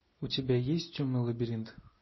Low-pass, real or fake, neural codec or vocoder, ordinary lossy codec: 7.2 kHz; real; none; MP3, 24 kbps